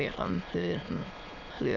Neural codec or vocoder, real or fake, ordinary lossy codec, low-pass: autoencoder, 22.05 kHz, a latent of 192 numbers a frame, VITS, trained on many speakers; fake; none; 7.2 kHz